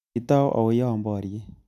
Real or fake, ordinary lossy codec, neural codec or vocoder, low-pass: real; none; none; 14.4 kHz